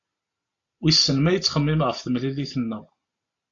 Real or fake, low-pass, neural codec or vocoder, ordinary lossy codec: real; 7.2 kHz; none; AAC, 48 kbps